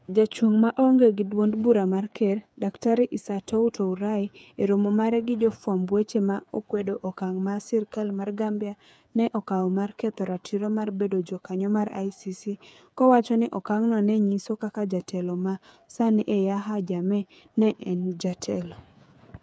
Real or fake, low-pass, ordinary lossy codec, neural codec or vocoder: fake; none; none; codec, 16 kHz, 8 kbps, FreqCodec, smaller model